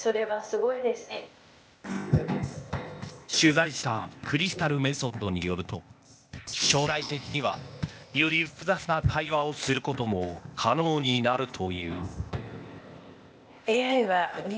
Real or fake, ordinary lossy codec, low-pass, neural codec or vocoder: fake; none; none; codec, 16 kHz, 0.8 kbps, ZipCodec